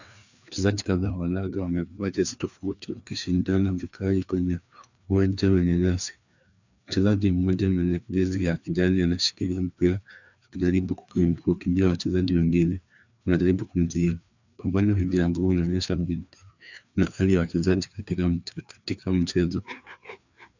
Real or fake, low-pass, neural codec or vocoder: fake; 7.2 kHz; codec, 16 kHz, 2 kbps, FreqCodec, larger model